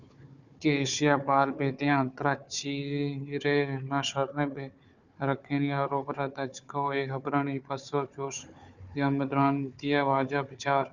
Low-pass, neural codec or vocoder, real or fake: 7.2 kHz; codec, 16 kHz, 4 kbps, FunCodec, trained on Chinese and English, 50 frames a second; fake